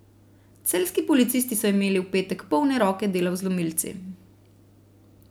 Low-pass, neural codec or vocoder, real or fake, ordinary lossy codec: none; none; real; none